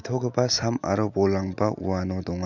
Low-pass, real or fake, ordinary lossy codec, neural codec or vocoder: 7.2 kHz; real; none; none